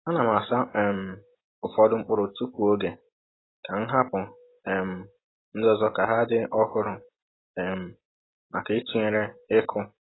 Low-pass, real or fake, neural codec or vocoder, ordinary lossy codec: 7.2 kHz; real; none; AAC, 16 kbps